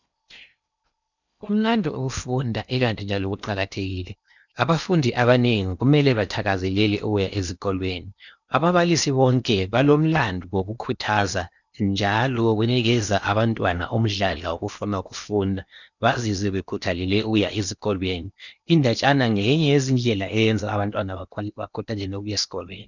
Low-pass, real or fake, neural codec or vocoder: 7.2 kHz; fake; codec, 16 kHz in and 24 kHz out, 0.8 kbps, FocalCodec, streaming, 65536 codes